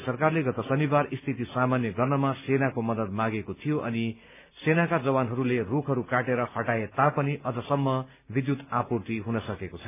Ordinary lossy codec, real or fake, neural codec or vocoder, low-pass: none; real; none; 3.6 kHz